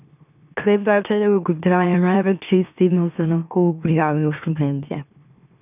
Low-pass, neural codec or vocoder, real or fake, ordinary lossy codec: 3.6 kHz; autoencoder, 44.1 kHz, a latent of 192 numbers a frame, MeloTTS; fake; none